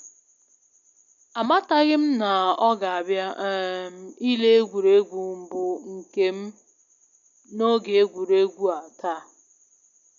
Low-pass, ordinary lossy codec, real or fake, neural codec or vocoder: 7.2 kHz; none; real; none